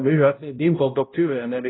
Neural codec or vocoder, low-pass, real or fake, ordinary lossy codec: codec, 16 kHz, 0.5 kbps, X-Codec, HuBERT features, trained on balanced general audio; 7.2 kHz; fake; AAC, 16 kbps